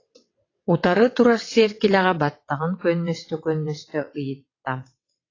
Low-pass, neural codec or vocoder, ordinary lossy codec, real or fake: 7.2 kHz; vocoder, 22.05 kHz, 80 mel bands, WaveNeXt; AAC, 32 kbps; fake